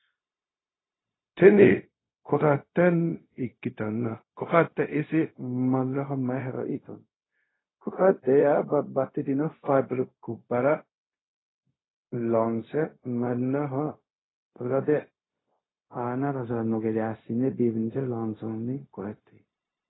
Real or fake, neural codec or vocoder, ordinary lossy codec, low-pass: fake; codec, 16 kHz, 0.4 kbps, LongCat-Audio-Codec; AAC, 16 kbps; 7.2 kHz